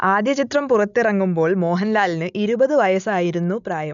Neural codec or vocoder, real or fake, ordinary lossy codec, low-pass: none; real; none; 7.2 kHz